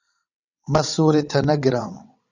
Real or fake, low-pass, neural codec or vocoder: fake; 7.2 kHz; vocoder, 22.05 kHz, 80 mel bands, WaveNeXt